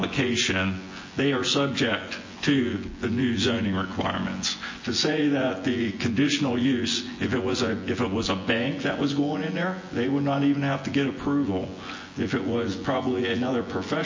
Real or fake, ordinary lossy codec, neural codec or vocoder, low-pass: fake; MP3, 32 kbps; vocoder, 24 kHz, 100 mel bands, Vocos; 7.2 kHz